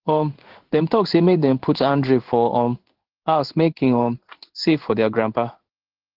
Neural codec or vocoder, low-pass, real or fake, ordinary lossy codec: codec, 16 kHz in and 24 kHz out, 1 kbps, XY-Tokenizer; 5.4 kHz; fake; Opus, 16 kbps